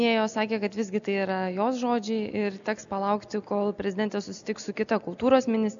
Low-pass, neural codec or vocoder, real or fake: 7.2 kHz; none; real